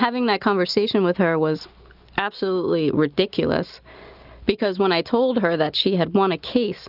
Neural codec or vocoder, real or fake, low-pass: none; real; 5.4 kHz